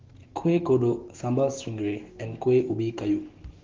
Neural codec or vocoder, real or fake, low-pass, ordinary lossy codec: autoencoder, 48 kHz, 128 numbers a frame, DAC-VAE, trained on Japanese speech; fake; 7.2 kHz; Opus, 16 kbps